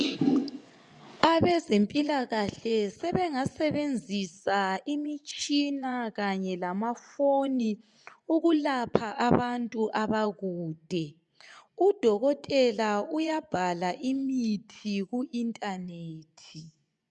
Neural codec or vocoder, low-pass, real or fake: vocoder, 24 kHz, 100 mel bands, Vocos; 10.8 kHz; fake